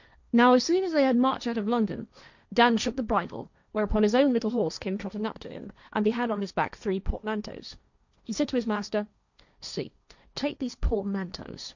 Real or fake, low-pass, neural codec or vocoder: fake; 7.2 kHz; codec, 16 kHz, 1.1 kbps, Voila-Tokenizer